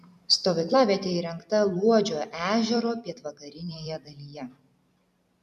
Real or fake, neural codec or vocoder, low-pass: fake; vocoder, 44.1 kHz, 128 mel bands every 256 samples, BigVGAN v2; 14.4 kHz